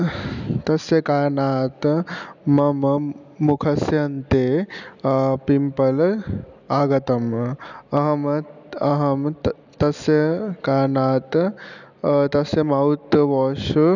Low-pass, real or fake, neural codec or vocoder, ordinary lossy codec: 7.2 kHz; real; none; none